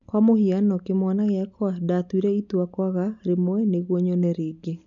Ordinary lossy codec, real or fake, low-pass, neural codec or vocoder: none; real; 7.2 kHz; none